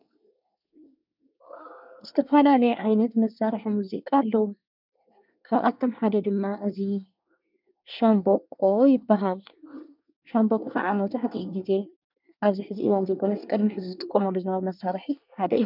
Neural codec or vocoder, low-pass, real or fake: codec, 24 kHz, 1 kbps, SNAC; 5.4 kHz; fake